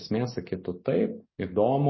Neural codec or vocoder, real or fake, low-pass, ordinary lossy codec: none; real; 7.2 kHz; MP3, 24 kbps